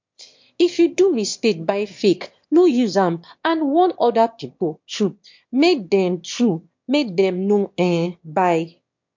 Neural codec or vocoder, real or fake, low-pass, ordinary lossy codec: autoencoder, 22.05 kHz, a latent of 192 numbers a frame, VITS, trained on one speaker; fake; 7.2 kHz; MP3, 48 kbps